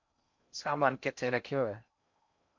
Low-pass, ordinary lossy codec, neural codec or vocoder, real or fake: 7.2 kHz; MP3, 48 kbps; codec, 16 kHz in and 24 kHz out, 0.6 kbps, FocalCodec, streaming, 4096 codes; fake